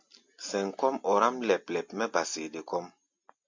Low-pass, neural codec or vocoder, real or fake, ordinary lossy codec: 7.2 kHz; none; real; MP3, 48 kbps